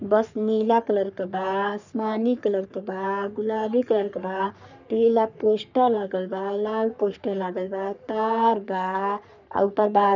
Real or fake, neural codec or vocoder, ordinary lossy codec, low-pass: fake; codec, 44.1 kHz, 3.4 kbps, Pupu-Codec; none; 7.2 kHz